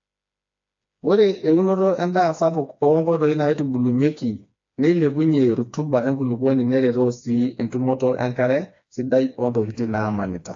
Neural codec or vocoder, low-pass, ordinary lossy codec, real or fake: codec, 16 kHz, 2 kbps, FreqCodec, smaller model; 7.2 kHz; AAC, 48 kbps; fake